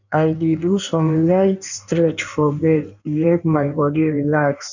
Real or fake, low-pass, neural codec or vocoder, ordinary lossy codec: fake; 7.2 kHz; codec, 16 kHz in and 24 kHz out, 1.1 kbps, FireRedTTS-2 codec; none